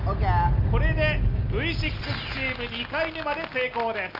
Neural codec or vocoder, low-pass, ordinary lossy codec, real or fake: none; 5.4 kHz; Opus, 32 kbps; real